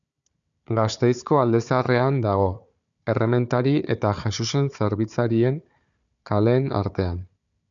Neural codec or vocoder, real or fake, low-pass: codec, 16 kHz, 4 kbps, FunCodec, trained on Chinese and English, 50 frames a second; fake; 7.2 kHz